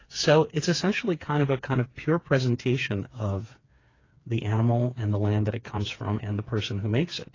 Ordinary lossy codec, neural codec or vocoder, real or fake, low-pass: AAC, 32 kbps; codec, 16 kHz, 4 kbps, FreqCodec, smaller model; fake; 7.2 kHz